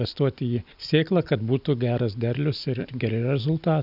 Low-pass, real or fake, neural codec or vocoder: 5.4 kHz; real; none